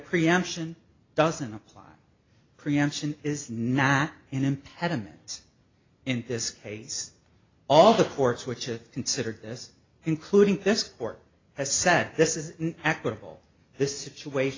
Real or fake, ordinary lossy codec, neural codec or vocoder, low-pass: real; AAC, 32 kbps; none; 7.2 kHz